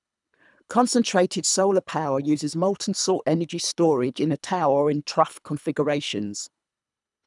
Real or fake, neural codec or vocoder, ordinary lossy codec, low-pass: fake; codec, 24 kHz, 3 kbps, HILCodec; none; 10.8 kHz